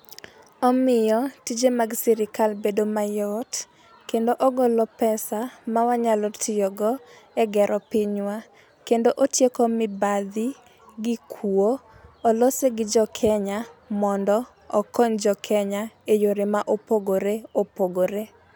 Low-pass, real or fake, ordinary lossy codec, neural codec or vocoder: none; real; none; none